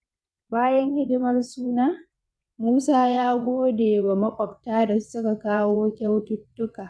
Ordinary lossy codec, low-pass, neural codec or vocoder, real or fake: none; none; vocoder, 22.05 kHz, 80 mel bands, WaveNeXt; fake